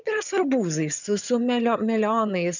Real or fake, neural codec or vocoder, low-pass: fake; vocoder, 22.05 kHz, 80 mel bands, HiFi-GAN; 7.2 kHz